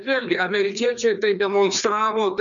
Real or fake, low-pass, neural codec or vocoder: fake; 7.2 kHz; codec, 16 kHz, 2 kbps, FreqCodec, larger model